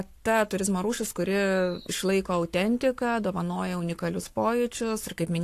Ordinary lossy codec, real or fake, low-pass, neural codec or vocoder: AAC, 64 kbps; fake; 14.4 kHz; codec, 44.1 kHz, 7.8 kbps, Pupu-Codec